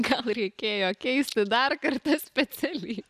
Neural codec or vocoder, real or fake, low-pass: none; real; 14.4 kHz